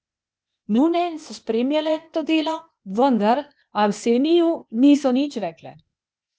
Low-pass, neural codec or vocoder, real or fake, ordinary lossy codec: none; codec, 16 kHz, 0.8 kbps, ZipCodec; fake; none